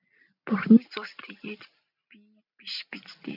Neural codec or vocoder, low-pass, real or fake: none; 5.4 kHz; real